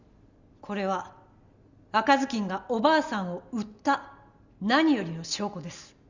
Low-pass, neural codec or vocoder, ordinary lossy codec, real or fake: 7.2 kHz; none; Opus, 64 kbps; real